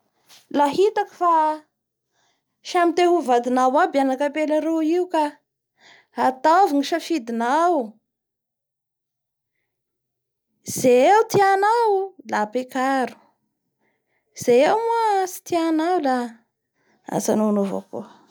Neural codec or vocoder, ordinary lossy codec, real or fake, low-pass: none; none; real; none